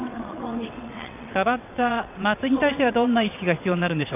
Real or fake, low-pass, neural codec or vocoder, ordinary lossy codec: fake; 3.6 kHz; vocoder, 22.05 kHz, 80 mel bands, WaveNeXt; none